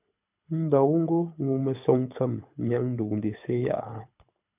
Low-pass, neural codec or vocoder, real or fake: 3.6 kHz; codec, 24 kHz, 6 kbps, HILCodec; fake